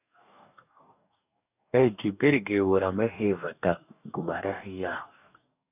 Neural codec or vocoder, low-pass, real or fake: codec, 44.1 kHz, 2.6 kbps, DAC; 3.6 kHz; fake